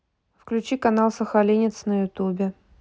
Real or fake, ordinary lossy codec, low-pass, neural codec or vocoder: real; none; none; none